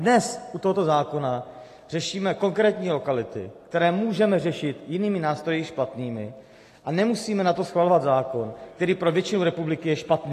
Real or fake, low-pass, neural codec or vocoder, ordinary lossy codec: real; 14.4 kHz; none; AAC, 48 kbps